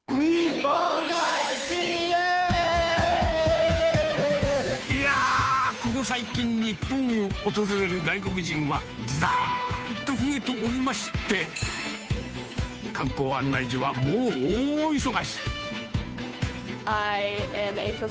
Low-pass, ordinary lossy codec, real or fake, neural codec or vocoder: none; none; fake; codec, 16 kHz, 2 kbps, FunCodec, trained on Chinese and English, 25 frames a second